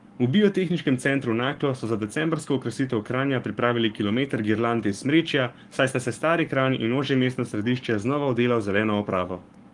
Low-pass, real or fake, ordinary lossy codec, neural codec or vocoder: 10.8 kHz; fake; Opus, 24 kbps; codec, 44.1 kHz, 7.8 kbps, Pupu-Codec